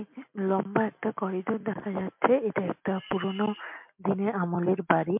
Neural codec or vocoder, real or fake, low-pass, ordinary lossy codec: none; real; 3.6 kHz; MP3, 32 kbps